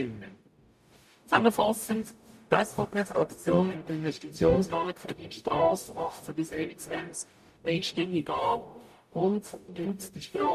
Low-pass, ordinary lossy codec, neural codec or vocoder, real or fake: 14.4 kHz; MP3, 64 kbps; codec, 44.1 kHz, 0.9 kbps, DAC; fake